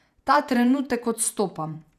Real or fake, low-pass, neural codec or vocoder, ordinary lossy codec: fake; 14.4 kHz; vocoder, 48 kHz, 128 mel bands, Vocos; none